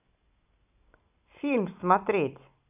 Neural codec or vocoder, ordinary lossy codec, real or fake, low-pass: none; none; real; 3.6 kHz